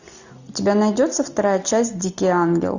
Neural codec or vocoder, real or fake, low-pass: none; real; 7.2 kHz